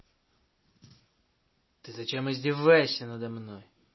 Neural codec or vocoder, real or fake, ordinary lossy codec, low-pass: none; real; MP3, 24 kbps; 7.2 kHz